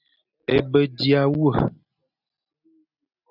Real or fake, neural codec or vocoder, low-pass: real; none; 5.4 kHz